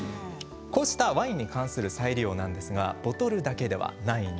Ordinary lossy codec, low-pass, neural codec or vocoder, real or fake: none; none; none; real